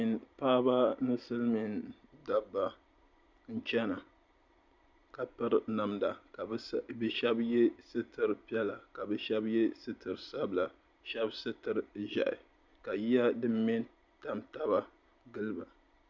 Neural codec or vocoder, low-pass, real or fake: none; 7.2 kHz; real